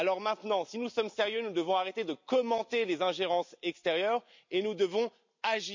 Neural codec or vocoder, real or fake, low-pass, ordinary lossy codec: none; real; 7.2 kHz; MP3, 64 kbps